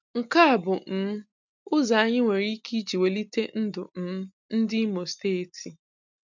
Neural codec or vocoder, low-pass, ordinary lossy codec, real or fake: none; 7.2 kHz; none; real